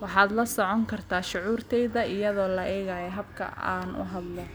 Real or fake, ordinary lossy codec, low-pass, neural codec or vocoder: real; none; none; none